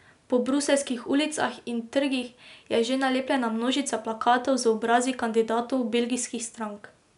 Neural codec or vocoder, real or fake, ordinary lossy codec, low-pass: none; real; none; 10.8 kHz